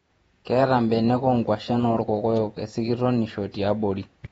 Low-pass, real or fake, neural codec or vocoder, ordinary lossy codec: 19.8 kHz; fake; vocoder, 48 kHz, 128 mel bands, Vocos; AAC, 24 kbps